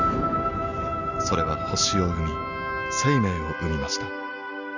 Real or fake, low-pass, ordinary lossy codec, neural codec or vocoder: real; 7.2 kHz; none; none